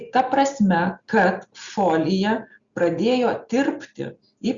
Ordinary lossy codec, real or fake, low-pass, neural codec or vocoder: Opus, 64 kbps; real; 7.2 kHz; none